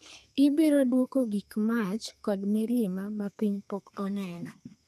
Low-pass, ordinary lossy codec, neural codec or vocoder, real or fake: 14.4 kHz; none; codec, 32 kHz, 1.9 kbps, SNAC; fake